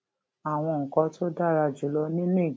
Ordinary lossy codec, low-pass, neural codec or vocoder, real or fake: none; none; none; real